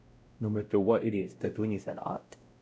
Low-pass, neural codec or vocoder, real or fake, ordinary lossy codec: none; codec, 16 kHz, 0.5 kbps, X-Codec, WavLM features, trained on Multilingual LibriSpeech; fake; none